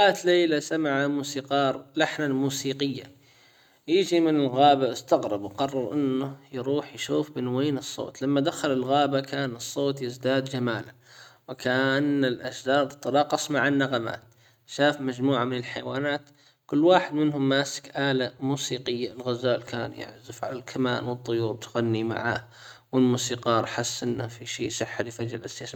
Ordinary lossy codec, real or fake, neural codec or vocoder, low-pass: none; real; none; 19.8 kHz